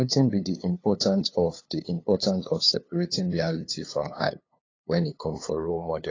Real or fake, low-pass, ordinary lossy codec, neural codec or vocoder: fake; 7.2 kHz; AAC, 32 kbps; codec, 16 kHz, 2 kbps, FunCodec, trained on LibriTTS, 25 frames a second